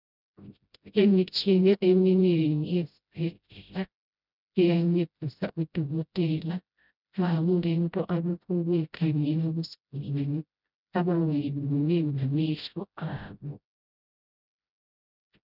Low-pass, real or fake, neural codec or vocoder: 5.4 kHz; fake; codec, 16 kHz, 0.5 kbps, FreqCodec, smaller model